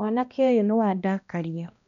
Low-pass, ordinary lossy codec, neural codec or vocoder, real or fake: 7.2 kHz; none; codec, 16 kHz, 1 kbps, X-Codec, HuBERT features, trained on balanced general audio; fake